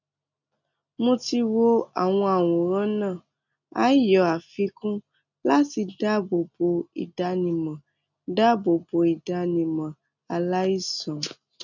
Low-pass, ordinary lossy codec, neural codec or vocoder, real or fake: 7.2 kHz; none; none; real